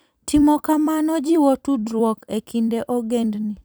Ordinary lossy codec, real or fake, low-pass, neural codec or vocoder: none; fake; none; vocoder, 44.1 kHz, 128 mel bands every 256 samples, BigVGAN v2